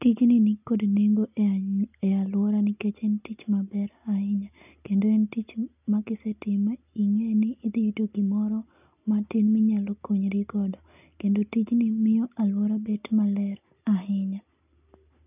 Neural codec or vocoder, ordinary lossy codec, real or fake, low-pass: none; none; real; 3.6 kHz